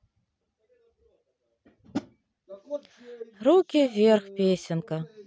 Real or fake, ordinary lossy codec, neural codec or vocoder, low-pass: real; none; none; none